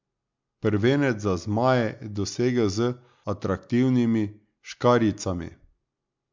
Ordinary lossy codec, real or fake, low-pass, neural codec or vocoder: MP3, 64 kbps; real; 7.2 kHz; none